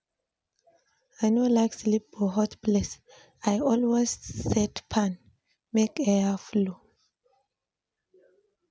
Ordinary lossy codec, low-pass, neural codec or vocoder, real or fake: none; none; none; real